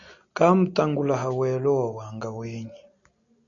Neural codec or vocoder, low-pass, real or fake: none; 7.2 kHz; real